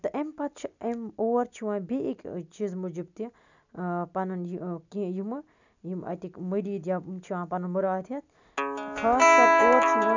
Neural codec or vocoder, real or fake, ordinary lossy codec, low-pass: none; real; none; 7.2 kHz